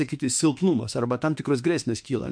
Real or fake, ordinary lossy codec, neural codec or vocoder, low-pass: fake; MP3, 64 kbps; autoencoder, 48 kHz, 32 numbers a frame, DAC-VAE, trained on Japanese speech; 9.9 kHz